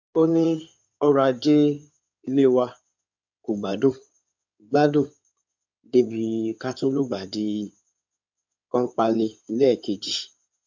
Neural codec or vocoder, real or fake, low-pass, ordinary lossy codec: codec, 16 kHz in and 24 kHz out, 2.2 kbps, FireRedTTS-2 codec; fake; 7.2 kHz; MP3, 64 kbps